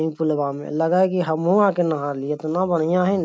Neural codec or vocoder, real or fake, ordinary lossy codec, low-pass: none; real; none; none